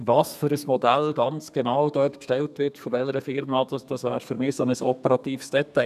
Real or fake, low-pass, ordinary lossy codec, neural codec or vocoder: fake; 14.4 kHz; none; codec, 32 kHz, 1.9 kbps, SNAC